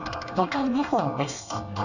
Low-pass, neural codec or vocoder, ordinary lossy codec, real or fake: 7.2 kHz; codec, 24 kHz, 1 kbps, SNAC; none; fake